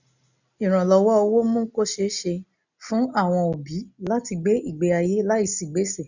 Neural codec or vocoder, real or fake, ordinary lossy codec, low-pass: none; real; none; 7.2 kHz